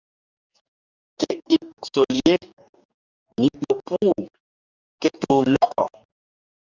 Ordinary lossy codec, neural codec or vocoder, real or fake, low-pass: Opus, 64 kbps; codec, 44.1 kHz, 2.6 kbps, DAC; fake; 7.2 kHz